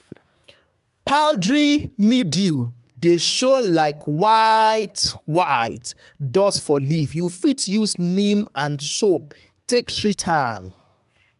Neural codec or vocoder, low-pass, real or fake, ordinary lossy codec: codec, 24 kHz, 1 kbps, SNAC; 10.8 kHz; fake; none